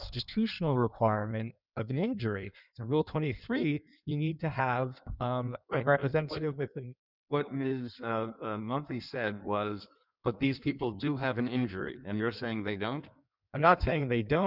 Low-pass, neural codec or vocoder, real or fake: 5.4 kHz; codec, 16 kHz in and 24 kHz out, 1.1 kbps, FireRedTTS-2 codec; fake